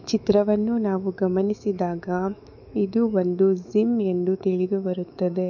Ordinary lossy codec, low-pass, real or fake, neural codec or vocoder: none; 7.2 kHz; fake; autoencoder, 48 kHz, 128 numbers a frame, DAC-VAE, trained on Japanese speech